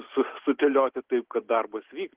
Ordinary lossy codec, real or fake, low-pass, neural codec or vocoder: Opus, 16 kbps; real; 3.6 kHz; none